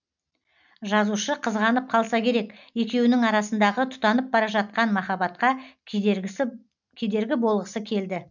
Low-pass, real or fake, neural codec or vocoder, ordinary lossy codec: 7.2 kHz; real; none; none